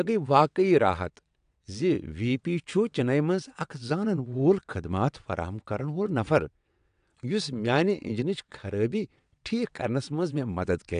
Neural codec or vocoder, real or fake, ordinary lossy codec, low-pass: vocoder, 22.05 kHz, 80 mel bands, WaveNeXt; fake; AAC, 96 kbps; 9.9 kHz